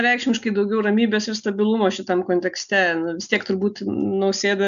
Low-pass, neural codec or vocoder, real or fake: 7.2 kHz; none; real